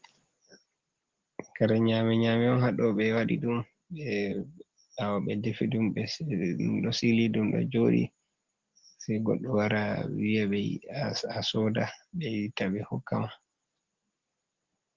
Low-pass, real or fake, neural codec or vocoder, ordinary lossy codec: 7.2 kHz; real; none; Opus, 16 kbps